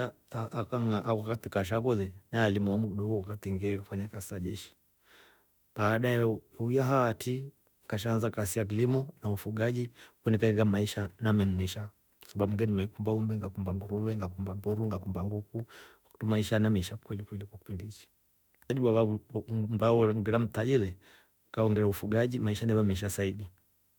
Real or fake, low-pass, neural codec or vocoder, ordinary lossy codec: fake; none; autoencoder, 48 kHz, 32 numbers a frame, DAC-VAE, trained on Japanese speech; none